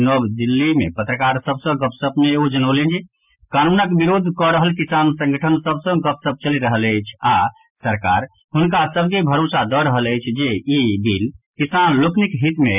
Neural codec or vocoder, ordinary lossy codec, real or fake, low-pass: none; none; real; 3.6 kHz